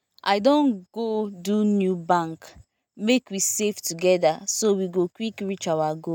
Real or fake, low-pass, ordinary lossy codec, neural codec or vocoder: real; none; none; none